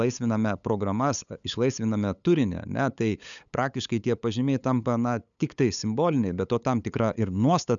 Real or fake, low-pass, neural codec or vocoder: fake; 7.2 kHz; codec, 16 kHz, 8 kbps, FunCodec, trained on LibriTTS, 25 frames a second